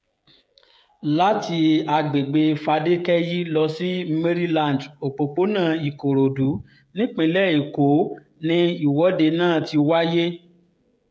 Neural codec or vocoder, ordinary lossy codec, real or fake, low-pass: codec, 16 kHz, 16 kbps, FreqCodec, smaller model; none; fake; none